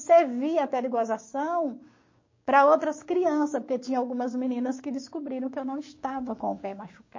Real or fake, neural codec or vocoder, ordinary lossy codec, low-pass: fake; codec, 16 kHz, 6 kbps, DAC; MP3, 32 kbps; 7.2 kHz